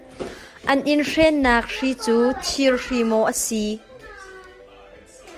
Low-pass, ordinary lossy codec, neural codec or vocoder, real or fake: 14.4 kHz; Opus, 24 kbps; none; real